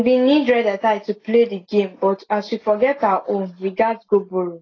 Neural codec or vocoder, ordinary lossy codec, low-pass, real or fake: none; AAC, 32 kbps; 7.2 kHz; real